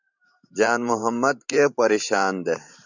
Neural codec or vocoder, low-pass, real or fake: vocoder, 44.1 kHz, 128 mel bands every 512 samples, BigVGAN v2; 7.2 kHz; fake